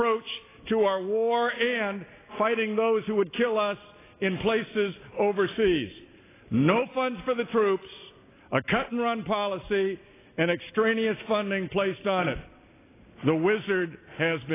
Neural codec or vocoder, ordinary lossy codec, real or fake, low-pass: none; AAC, 16 kbps; real; 3.6 kHz